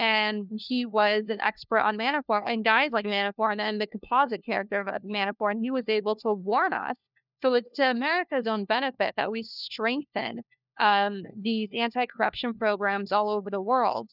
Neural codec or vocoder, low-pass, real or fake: codec, 16 kHz, 1 kbps, FunCodec, trained on LibriTTS, 50 frames a second; 5.4 kHz; fake